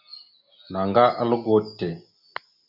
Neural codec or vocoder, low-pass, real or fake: none; 5.4 kHz; real